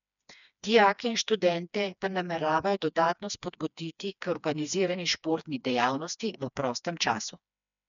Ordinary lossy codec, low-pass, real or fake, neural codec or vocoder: none; 7.2 kHz; fake; codec, 16 kHz, 2 kbps, FreqCodec, smaller model